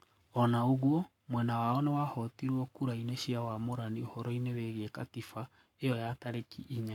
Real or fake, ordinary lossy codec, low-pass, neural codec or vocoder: fake; none; 19.8 kHz; codec, 44.1 kHz, 7.8 kbps, Pupu-Codec